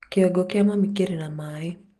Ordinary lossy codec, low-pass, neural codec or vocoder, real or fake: Opus, 24 kbps; 14.4 kHz; none; real